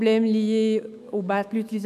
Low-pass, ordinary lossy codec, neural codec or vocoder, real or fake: 14.4 kHz; none; autoencoder, 48 kHz, 128 numbers a frame, DAC-VAE, trained on Japanese speech; fake